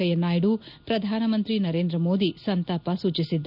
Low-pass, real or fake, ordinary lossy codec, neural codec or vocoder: 5.4 kHz; real; none; none